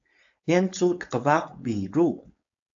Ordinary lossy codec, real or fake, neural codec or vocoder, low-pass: AAC, 64 kbps; fake; codec, 16 kHz, 4.8 kbps, FACodec; 7.2 kHz